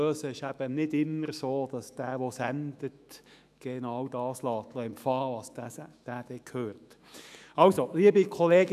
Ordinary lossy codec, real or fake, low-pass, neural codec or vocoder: none; fake; 14.4 kHz; autoencoder, 48 kHz, 128 numbers a frame, DAC-VAE, trained on Japanese speech